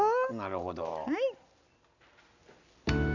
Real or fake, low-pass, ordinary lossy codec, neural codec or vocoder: real; 7.2 kHz; none; none